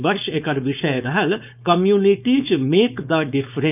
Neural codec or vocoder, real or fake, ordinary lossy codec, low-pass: codec, 16 kHz, 4.8 kbps, FACodec; fake; none; 3.6 kHz